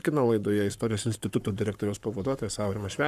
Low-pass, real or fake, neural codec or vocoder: 14.4 kHz; fake; codec, 44.1 kHz, 7.8 kbps, Pupu-Codec